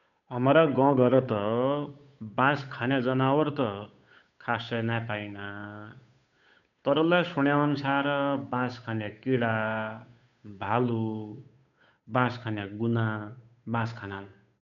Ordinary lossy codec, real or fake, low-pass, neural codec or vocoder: none; fake; 7.2 kHz; codec, 16 kHz, 8 kbps, FunCodec, trained on Chinese and English, 25 frames a second